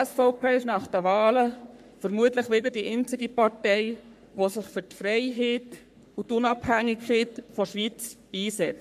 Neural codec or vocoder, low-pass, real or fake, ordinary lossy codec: codec, 44.1 kHz, 3.4 kbps, Pupu-Codec; 14.4 kHz; fake; MP3, 96 kbps